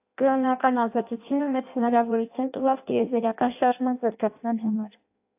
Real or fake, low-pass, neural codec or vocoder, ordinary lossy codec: fake; 3.6 kHz; codec, 16 kHz in and 24 kHz out, 0.6 kbps, FireRedTTS-2 codec; AAC, 32 kbps